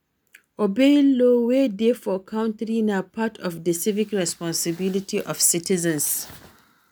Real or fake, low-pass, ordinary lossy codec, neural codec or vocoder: real; none; none; none